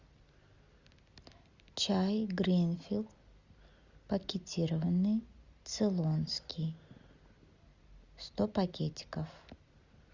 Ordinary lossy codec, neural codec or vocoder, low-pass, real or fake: Opus, 64 kbps; none; 7.2 kHz; real